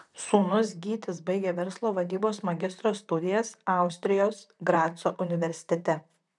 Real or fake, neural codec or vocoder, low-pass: fake; vocoder, 44.1 kHz, 128 mel bands, Pupu-Vocoder; 10.8 kHz